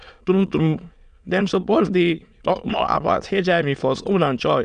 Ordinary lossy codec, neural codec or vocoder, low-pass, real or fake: none; autoencoder, 22.05 kHz, a latent of 192 numbers a frame, VITS, trained on many speakers; 9.9 kHz; fake